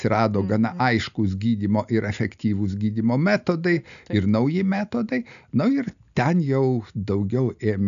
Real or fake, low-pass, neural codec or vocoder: real; 7.2 kHz; none